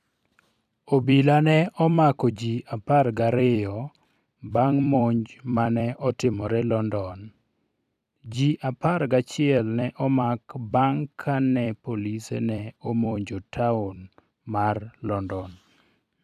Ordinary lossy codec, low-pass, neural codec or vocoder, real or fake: none; 14.4 kHz; vocoder, 44.1 kHz, 128 mel bands every 256 samples, BigVGAN v2; fake